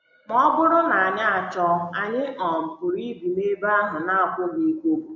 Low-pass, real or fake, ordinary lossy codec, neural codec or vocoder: 7.2 kHz; real; MP3, 48 kbps; none